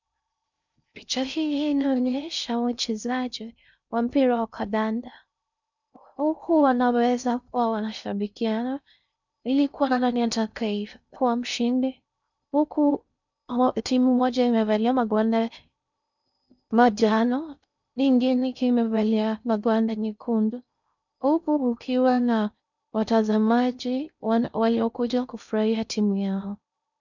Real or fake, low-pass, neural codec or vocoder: fake; 7.2 kHz; codec, 16 kHz in and 24 kHz out, 0.6 kbps, FocalCodec, streaming, 2048 codes